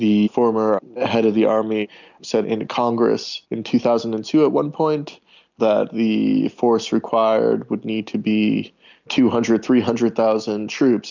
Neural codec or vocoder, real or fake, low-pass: none; real; 7.2 kHz